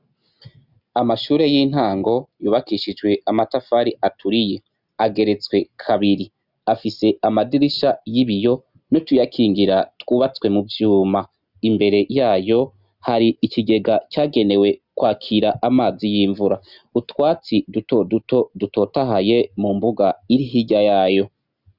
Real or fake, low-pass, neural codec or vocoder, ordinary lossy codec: real; 5.4 kHz; none; Opus, 64 kbps